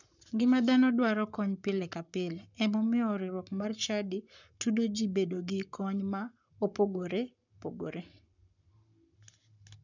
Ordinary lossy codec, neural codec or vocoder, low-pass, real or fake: none; codec, 44.1 kHz, 7.8 kbps, Pupu-Codec; 7.2 kHz; fake